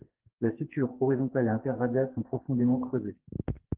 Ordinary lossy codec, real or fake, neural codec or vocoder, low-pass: Opus, 16 kbps; fake; codec, 44.1 kHz, 2.6 kbps, SNAC; 3.6 kHz